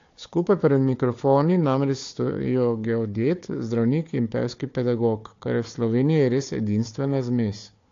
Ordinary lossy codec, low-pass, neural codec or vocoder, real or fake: AAC, 48 kbps; 7.2 kHz; codec, 16 kHz, 4 kbps, FunCodec, trained on Chinese and English, 50 frames a second; fake